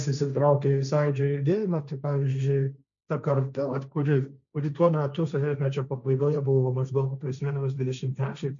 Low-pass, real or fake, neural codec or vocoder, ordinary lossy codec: 7.2 kHz; fake; codec, 16 kHz, 1.1 kbps, Voila-Tokenizer; MP3, 96 kbps